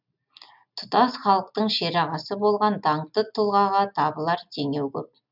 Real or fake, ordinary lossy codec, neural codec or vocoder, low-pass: real; none; none; 5.4 kHz